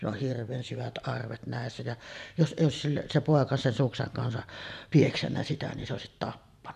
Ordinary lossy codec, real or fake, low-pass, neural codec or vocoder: none; fake; 14.4 kHz; vocoder, 44.1 kHz, 128 mel bands every 512 samples, BigVGAN v2